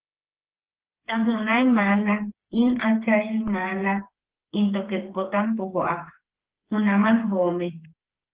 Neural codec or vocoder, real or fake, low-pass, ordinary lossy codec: codec, 16 kHz, 4 kbps, FreqCodec, smaller model; fake; 3.6 kHz; Opus, 16 kbps